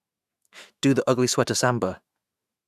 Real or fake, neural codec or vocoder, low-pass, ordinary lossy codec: fake; autoencoder, 48 kHz, 128 numbers a frame, DAC-VAE, trained on Japanese speech; 14.4 kHz; Opus, 64 kbps